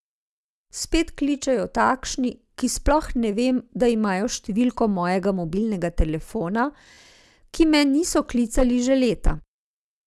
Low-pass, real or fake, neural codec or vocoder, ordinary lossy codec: none; real; none; none